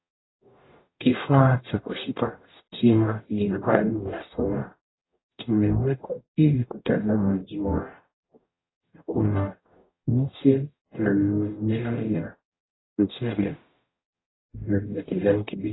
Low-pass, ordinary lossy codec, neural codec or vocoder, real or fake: 7.2 kHz; AAC, 16 kbps; codec, 44.1 kHz, 0.9 kbps, DAC; fake